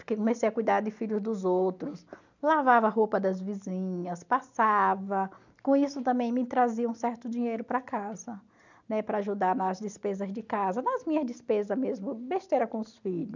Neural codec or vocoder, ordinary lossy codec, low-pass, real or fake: none; none; 7.2 kHz; real